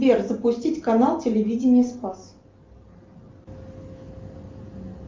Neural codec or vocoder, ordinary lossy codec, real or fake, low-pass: none; Opus, 24 kbps; real; 7.2 kHz